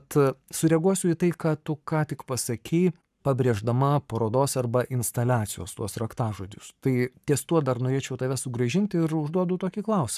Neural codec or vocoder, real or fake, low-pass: codec, 44.1 kHz, 7.8 kbps, Pupu-Codec; fake; 14.4 kHz